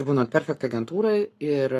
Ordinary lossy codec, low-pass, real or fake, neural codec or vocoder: AAC, 48 kbps; 14.4 kHz; fake; codec, 44.1 kHz, 7.8 kbps, Pupu-Codec